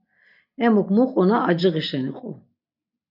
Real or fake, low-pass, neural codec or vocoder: real; 5.4 kHz; none